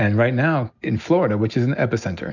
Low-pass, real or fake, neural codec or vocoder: 7.2 kHz; real; none